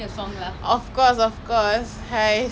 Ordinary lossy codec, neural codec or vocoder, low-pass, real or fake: none; none; none; real